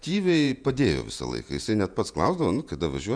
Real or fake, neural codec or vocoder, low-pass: fake; vocoder, 48 kHz, 128 mel bands, Vocos; 9.9 kHz